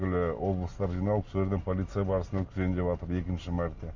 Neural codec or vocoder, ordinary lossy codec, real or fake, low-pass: none; Opus, 64 kbps; real; 7.2 kHz